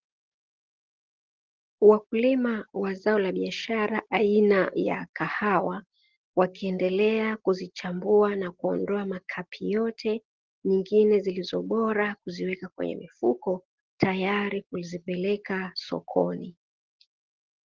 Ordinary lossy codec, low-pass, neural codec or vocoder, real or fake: Opus, 16 kbps; 7.2 kHz; none; real